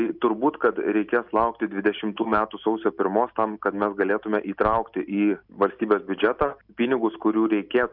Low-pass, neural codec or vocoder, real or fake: 5.4 kHz; none; real